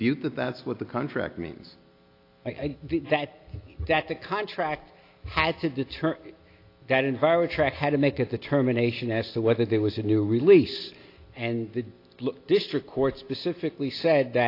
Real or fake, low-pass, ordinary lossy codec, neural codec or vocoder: real; 5.4 kHz; AAC, 32 kbps; none